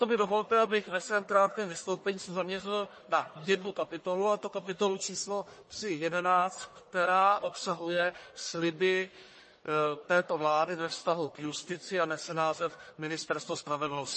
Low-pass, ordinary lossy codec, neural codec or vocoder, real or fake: 10.8 kHz; MP3, 32 kbps; codec, 44.1 kHz, 1.7 kbps, Pupu-Codec; fake